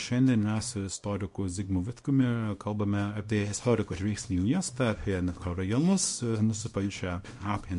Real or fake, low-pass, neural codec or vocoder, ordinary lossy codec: fake; 10.8 kHz; codec, 24 kHz, 0.9 kbps, WavTokenizer, small release; MP3, 48 kbps